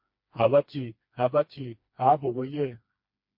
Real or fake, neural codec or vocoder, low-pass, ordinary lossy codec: fake; codec, 16 kHz, 2 kbps, FreqCodec, smaller model; 5.4 kHz; MP3, 32 kbps